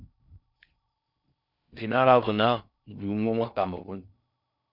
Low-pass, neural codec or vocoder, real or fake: 5.4 kHz; codec, 16 kHz in and 24 kHz out, 0.6 kbps, FocalCodec, streaming, 4096 codes; fake